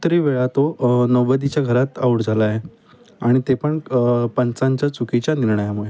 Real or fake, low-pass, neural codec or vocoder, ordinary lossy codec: real; none; none; none